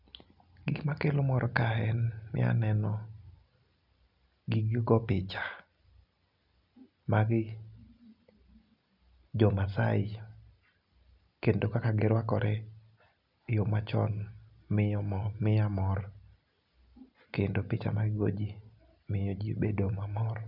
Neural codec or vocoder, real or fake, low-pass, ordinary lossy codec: none; real; 5.4 kHz; none